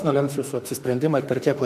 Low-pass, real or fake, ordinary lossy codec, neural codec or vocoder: 14.4 kHz; fake; MP3, 96 kbps; autoencoder, 48 kHz, 32 numbers a frame, DAC-VAE, trained on Japanese speech